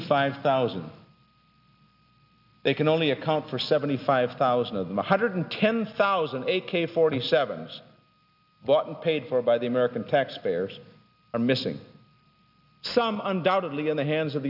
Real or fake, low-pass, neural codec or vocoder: real; 5.4 kHz; none